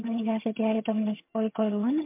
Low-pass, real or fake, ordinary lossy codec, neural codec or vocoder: 3.6 kHz; fake; MP3, 32 kbps; vocoder, 22.05 kHz, 80 mel bands, HiFi-GAN